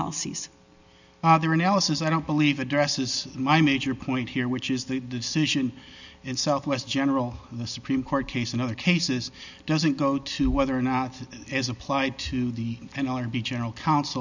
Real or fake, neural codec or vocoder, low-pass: real; none; 7.2 kHz